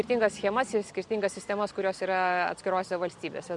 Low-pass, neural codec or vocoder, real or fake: 10.8 kHz; none; real